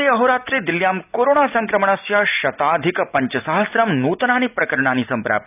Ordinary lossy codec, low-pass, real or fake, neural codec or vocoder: none; 3.6 kHz; real; none